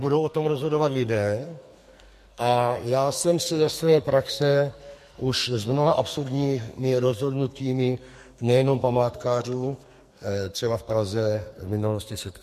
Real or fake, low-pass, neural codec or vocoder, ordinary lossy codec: fake; 14.4 kHz; codec, 44.1 kHz, 2.6 kbps, SNAC; MP3, 64 kbps